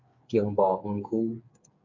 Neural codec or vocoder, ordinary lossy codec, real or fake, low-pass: codec, 16 kHz, 8 kbps, FreqCodec, smaller model; MP3, 48 kbps; fake; 7.2 kHz